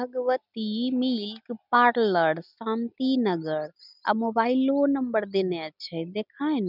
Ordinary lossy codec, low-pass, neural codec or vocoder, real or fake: none; 5.4 kHz; none; real